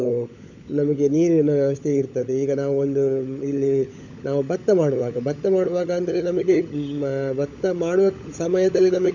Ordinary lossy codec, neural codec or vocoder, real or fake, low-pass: none; codec, 16 kHz, 16 kbps, FunCodec, trained on LibriTTS, 50 frames a second; fake; 7.2 kHz